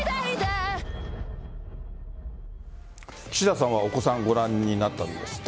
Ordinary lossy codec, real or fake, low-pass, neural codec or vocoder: none; real; none; none